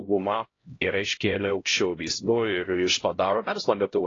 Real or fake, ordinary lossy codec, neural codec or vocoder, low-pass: fake; AAC, 32 kbps; codec, 16 kHz, 0.5 kbps, X-Codec, HuBERT features, trained on LibriSpeech; 7.2 kHz